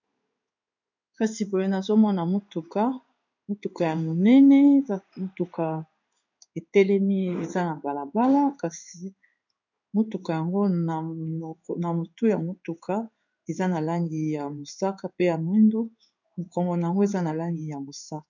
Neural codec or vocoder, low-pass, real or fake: codec, 16 kHz in and 24 kHz out, 1 kbps, XY-Tokenizer; 7.2 kHz; fake